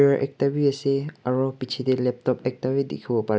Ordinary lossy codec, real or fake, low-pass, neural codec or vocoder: none; real; none; none